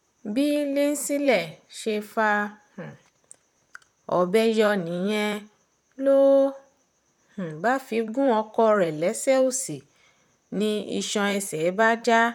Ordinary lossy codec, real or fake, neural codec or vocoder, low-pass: none; fake; vocoder, 44.1 kHz, 128 mel bands, Pupu-Vocoder; 19.8 kHz